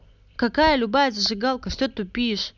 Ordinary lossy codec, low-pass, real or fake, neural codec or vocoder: none; 7.2 kHz; real; none